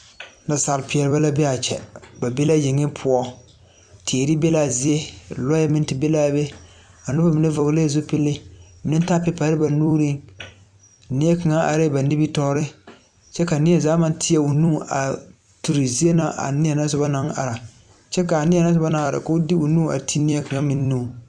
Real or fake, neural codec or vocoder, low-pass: fake; vocoder, 44.1 kHz, 128 mel bands every 256 samples, BigVGAN v2; 9.9 kHz